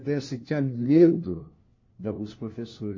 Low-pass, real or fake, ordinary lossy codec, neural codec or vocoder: 7.2 kHz; fake; MP3, 32 kbps; codec, 16 kHz, 1 kbps, FunCodec, trained on LibriTTS, 50 frames a second